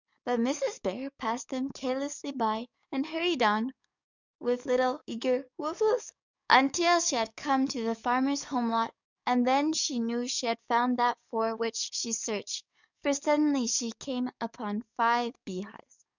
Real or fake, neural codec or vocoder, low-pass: fake; codec, 44.1 kHz, 7.8 kbps, DAC; 7.2 kHz